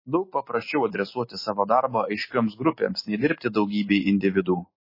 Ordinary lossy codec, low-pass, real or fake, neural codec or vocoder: MP3, 24 kbps; 5.4 kHz; real; none